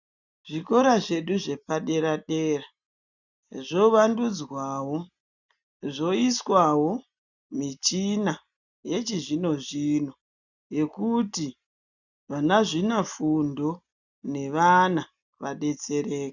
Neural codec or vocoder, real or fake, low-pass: none; real; 7.2 kHz